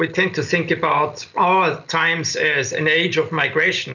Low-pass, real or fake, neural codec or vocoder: 7.2 kHz; real; none